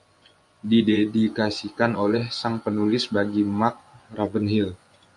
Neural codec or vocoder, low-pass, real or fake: vocoder, 24 kHz, 100 mel bands, Vocos; 10.8 kHz; fake